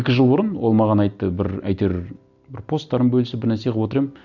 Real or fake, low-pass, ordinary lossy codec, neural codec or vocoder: real; 7.2 kHz; none; none